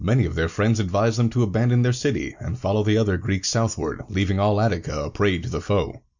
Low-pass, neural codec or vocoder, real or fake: 7.2 kHz; none; real